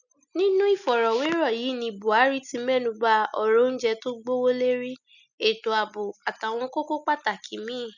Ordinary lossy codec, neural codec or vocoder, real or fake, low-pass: none; none; real; 7.2 kHz